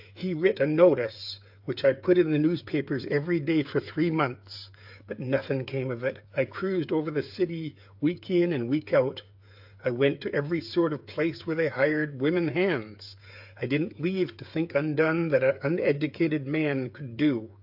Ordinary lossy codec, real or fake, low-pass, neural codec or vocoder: AAC, 48 kbps; fake; 5.4 kHz; codec, 16 kHz, 8 kbps, FreqCodec, smaller model